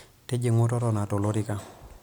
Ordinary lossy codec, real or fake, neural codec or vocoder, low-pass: none; real; none; none